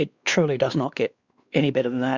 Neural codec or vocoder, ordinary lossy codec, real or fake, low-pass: codec, 16 kHz, 2 kbps, X-Codec, WavLM features, trained on Multilingual LibriSpeech; Opus, 64 kbps; fake; 7.2 kHz